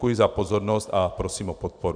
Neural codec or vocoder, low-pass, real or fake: none; 9.9 kHz; real